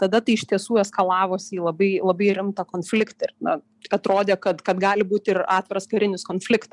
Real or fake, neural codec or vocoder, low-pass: real; none; 10.8 kHz